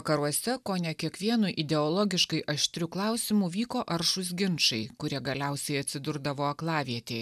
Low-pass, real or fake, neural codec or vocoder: 14.4 kHz; real; none